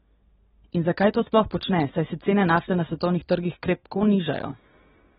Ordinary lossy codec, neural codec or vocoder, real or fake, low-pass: AAC, 16 kbps; none; real; 7.2 kHz